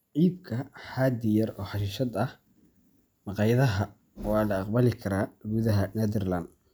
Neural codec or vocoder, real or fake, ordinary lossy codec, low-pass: none; real; none; none